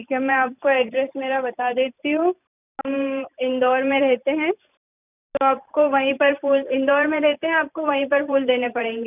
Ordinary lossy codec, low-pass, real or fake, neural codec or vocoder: none; 3.6 kHz; fake; vocoder, 44.1 kHz, 128 mel bands every 512 samples, BigVGAN v2